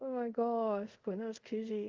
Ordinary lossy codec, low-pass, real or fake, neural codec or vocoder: Opus, 32 kbps; 7.2 kHz; fake; codec, 24 kHz, 0.5 kbps, DualCodec